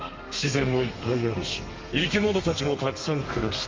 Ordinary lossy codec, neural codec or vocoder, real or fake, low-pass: Opus, 32 kbps; codec, 32 kHz, 1.9 kbps, SNAC; fake; 7.2 kHz